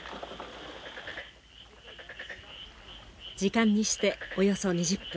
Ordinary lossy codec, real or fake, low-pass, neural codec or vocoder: none; real; none; none